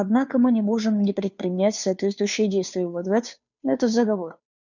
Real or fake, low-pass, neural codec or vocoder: fake; 7.2 kHz; codec, 16 kHz, 2 kbps, FunCodec, trained on Chinese and English, 25 frames a second